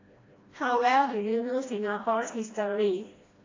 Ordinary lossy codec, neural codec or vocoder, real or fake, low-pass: AAC, 32 kbps; codec, 16 kHz, 1 kbps, FreqCodec, smaller model; fake; 7.2 kHz